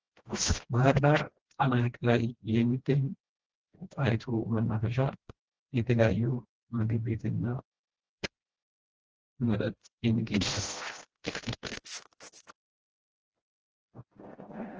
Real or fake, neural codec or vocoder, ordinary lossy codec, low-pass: fake; codec, 16 kHz, 1 kbps, FreqCodec, smaller model; Opus, 16 kbps; 7.2 kHz